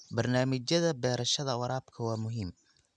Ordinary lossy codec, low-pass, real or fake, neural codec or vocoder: none; 9.9 kHz; real; none